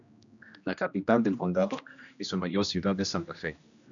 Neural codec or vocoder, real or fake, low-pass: codec, 16 kHz, 1 kbps, X-Codec, HuBERT features, trained on general audio; fake; 7.2 kHz